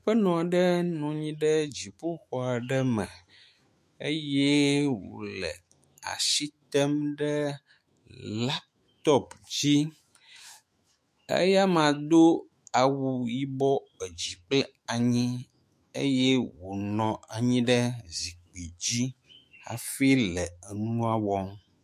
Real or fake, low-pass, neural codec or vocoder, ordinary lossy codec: fake; 14.4 kHz; autoencoder, 48 kHz, 128 numbers a frame, DAC-VAE, trained on Japanese speech; MP3, 64 kbps